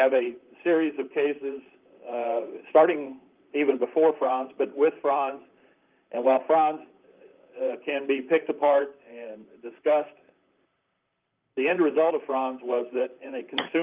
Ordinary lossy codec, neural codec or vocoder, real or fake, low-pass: Opus, 24 kbps; vocoder, 44.1 kHz, 128 mel bands, Pupu-Vocoder; fake; 3.6 kHz